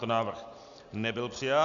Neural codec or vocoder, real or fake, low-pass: none; real; 7.2 kHz